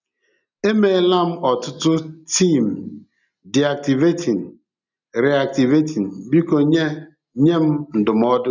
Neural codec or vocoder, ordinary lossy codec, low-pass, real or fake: none; none; 7.2 kHz; real